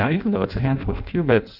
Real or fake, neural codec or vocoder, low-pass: fake; codec, 16 kHz in and 24 kHz out, 0.6 kbps, FireRedTTS-2 codec; 5.4 kHz